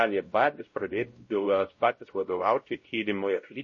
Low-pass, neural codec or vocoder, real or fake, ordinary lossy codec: 7.2 kHz; codec, 16 kHz, 0.5 kbps, X-Codec, WavLM features, trained on Multilingual LibriSpeech; fake; MP3, 32 kbps